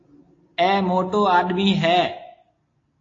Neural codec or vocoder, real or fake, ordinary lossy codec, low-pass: none; real; AAC, 32 kbps; 7.2 kHz